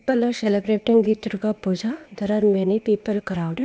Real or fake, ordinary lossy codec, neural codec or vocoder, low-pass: fake; none; codec, 16 kHz, 0.8 kbps, ZipCodec; none